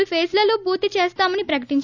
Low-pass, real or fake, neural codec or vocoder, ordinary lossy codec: 7.2 kHz; real; none; none